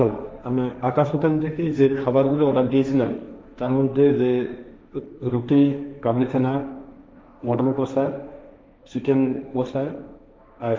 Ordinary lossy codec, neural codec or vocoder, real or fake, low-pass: none; codec, 16 kHz, 1.1 kbps, Voila-Tokenizer; fake; none